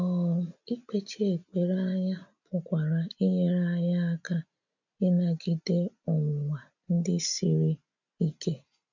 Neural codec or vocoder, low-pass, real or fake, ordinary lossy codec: none; 7.2 kHz; real; none